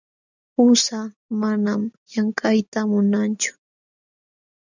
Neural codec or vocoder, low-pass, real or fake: none; 7.2 kHz; real